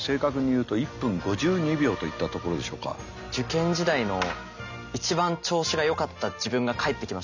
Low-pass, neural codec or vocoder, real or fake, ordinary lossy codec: 7.2 kHz; none; real; none